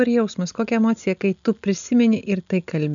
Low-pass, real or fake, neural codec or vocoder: 7.2 kHz; real; none